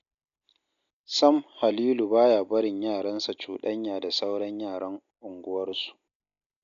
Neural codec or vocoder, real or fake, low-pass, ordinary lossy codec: none; real; 7.2 kHz; none